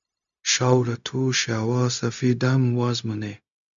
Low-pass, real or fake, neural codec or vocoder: 7.2 kHz; fake; codec, 16 kHz, 0.4 kbps, LongCat-Audio-Codec